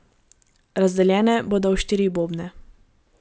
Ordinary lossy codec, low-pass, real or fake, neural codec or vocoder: none; none; real; none